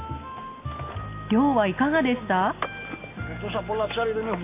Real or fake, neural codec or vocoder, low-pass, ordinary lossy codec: real; none; 3.6 kHz; AAC, 24 kbps